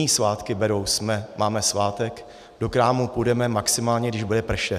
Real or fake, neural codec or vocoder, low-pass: real; none; 14.4 kHz